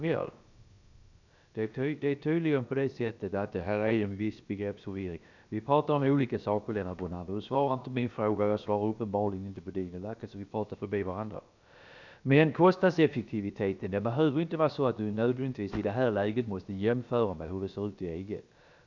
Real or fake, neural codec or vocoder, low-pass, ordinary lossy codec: fake; codec, 16 kHz, about 1 kbps, DyCAST, with the encoder's durations; 7.2 kHz; none